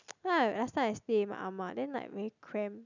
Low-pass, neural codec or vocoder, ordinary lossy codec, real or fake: 7.2 kHz; none; none; real